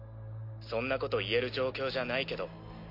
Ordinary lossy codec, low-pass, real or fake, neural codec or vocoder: MP3, 32 kbps; 5.4 kHz; real; none